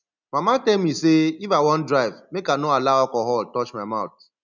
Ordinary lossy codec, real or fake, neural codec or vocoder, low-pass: none; real; none; 7.2 kHz